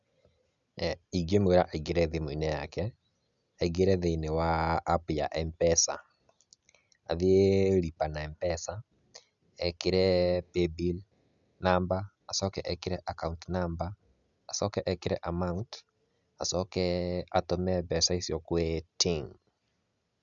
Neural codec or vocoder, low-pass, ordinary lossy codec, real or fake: none; 7.2 kHz; none; real